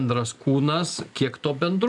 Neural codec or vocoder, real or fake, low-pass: none; real; 10.8 kHz